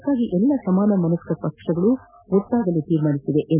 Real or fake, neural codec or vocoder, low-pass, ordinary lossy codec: real; none; 3.6 kHz; none